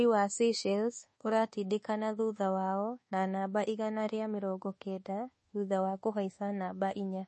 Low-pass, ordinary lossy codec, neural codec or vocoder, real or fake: 10.8 kHz; MP3, 32 kbps; codec, 24 kHz, 1.2 kbps, DualCodec; fake